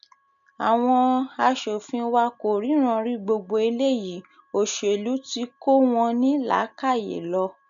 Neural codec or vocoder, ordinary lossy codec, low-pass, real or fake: none; AAC, 96 kbps; 7.2 kHz; real